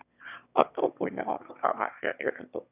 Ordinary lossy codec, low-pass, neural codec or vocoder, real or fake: none; 3.6 kHz; autoencoder, 22.05 kHz, a latent of 192 numbers a frame, VITS, trained on one speaker; fake